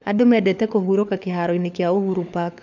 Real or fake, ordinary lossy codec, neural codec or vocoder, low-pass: fake; none; codec, 16 kHz, 4 kbps, FunCodec, trained on LibriTTS, 50 frames a second; 7.2 kHz